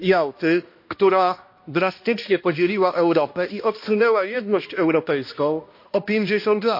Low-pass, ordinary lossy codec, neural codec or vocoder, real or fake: 5.4 kHz; MP3, 32 kbps; codec, 16 kHz, 1 kbps, X-Codec, HuBERT features, trained on balanced general audio; fake